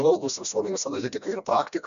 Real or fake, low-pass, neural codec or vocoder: fake; 7.2 kHz; codec, 16 kHz, 1 kbps, FreqCodec, smaller model